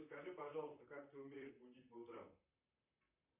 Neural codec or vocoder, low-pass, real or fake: vocoder, 44.1 kHz, 128 mel bands, Pupu-Vocoder; 3.6 kHz; fake